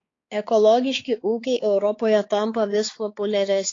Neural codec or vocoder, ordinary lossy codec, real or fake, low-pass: codec, 16 kHz, 4 kbps, X-Codec, HuBERT features, trained on balanced general audio; AAC, 32 kbps; fake; 7.2 kHz